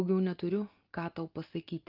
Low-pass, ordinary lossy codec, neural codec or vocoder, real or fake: 5.4 kHz; Opus, 32 kbps; none; real